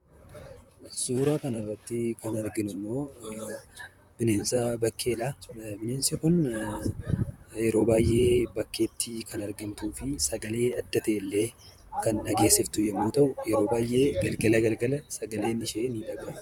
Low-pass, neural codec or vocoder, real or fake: 19.8 kHz; vocoder, 44.1 kHz, 128 mel bands, Pupu-Vocoder; fake